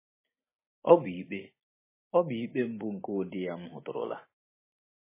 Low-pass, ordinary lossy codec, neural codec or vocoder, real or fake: 3.6 kHz; MP3, 16 kbps; none; real